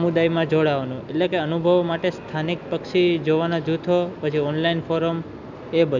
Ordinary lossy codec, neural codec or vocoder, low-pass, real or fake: none; none; 7.2 kHz; real